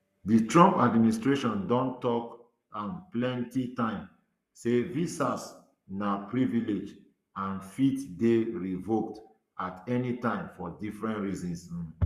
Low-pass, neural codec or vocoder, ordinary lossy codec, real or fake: 14.4 kHz; codec, 44.1 kHz, 7.8 kbps, Pupu-Codec; Opus, 64 kbps; fake